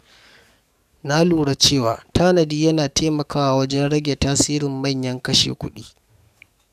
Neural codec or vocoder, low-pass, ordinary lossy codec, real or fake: codec, 44.1 kHz, 7.8 kbps, DAC; 14.4 kHz; none; fake